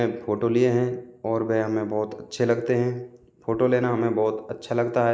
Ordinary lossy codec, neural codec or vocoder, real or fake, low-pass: none; none; real; none